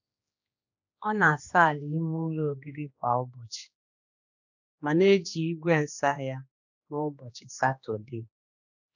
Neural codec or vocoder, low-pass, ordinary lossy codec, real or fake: codec, 16 kHz, 2 kbps, X-Codec, HuBERT features, trained on general audio; 7.2 kHz; none; fake